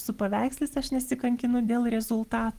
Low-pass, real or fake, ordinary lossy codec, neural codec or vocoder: 14.4 kHz; real; Opus, 24 kbps; none